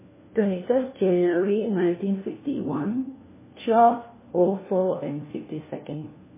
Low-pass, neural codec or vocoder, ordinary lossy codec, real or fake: 3.6 kHz; codec, 16 kHz, 1 kbps, FunCodec, trained on LibriTTS, 50 frames a second; MP3, 16 kbps; fake